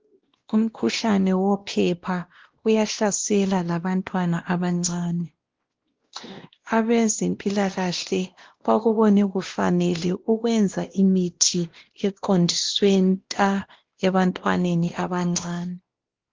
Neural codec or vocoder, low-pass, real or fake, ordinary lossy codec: codec, 16 kHz, 1 kbps, X-Codec, WavLM features, trained on Multilingual LibriSpeech; 7.2 kHz; fake; Opus, 16 kbps